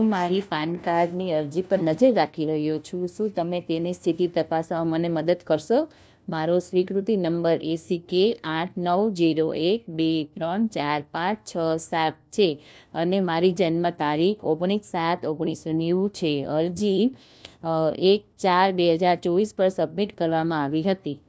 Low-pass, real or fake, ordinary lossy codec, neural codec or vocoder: none; fake; none; codec, 16 kHz, 1 kbps, FunCodec, trained on LibriTTS, 50 frames a second